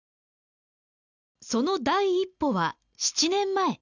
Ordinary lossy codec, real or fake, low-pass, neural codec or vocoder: none; real; 7.2 kHz; none